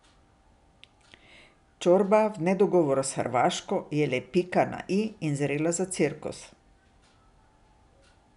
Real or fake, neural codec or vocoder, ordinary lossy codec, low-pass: real; none; none; 10.8 kHz